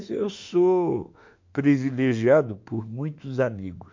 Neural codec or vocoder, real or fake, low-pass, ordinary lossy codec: autoencoder, 48 kHz, 32 numbers a frame, DAC-VAE, trained on Japanese speech; fake; 7.2 kHz; MP3, 48 kbps